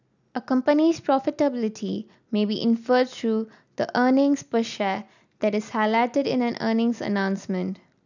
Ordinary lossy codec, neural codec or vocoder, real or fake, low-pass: none; none; real; 7.2 kHz